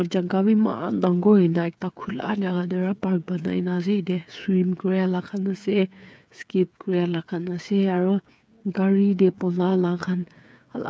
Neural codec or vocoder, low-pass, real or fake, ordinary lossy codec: codec, 16 kHz, 8 kbps, FreqCodec, smaller model; none; fake; none